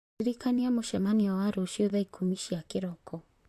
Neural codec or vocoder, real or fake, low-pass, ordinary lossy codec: vocoder, 44.1 kHz, 128 mel bands, Pupu-Vocoder; fake; 14.4 kHz; MP3, 64 kbps